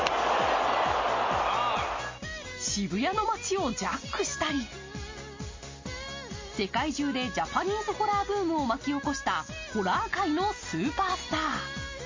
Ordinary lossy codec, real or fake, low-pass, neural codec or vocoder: MP3, 32 kbps; real; 7.2 kHz; none